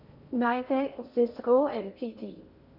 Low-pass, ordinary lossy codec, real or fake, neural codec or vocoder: 5.4 kHz; none; fake; codec, 16 kHz in and 24 kHz out, 0.8 kbps, FocalCodec, streaming, 65536 codes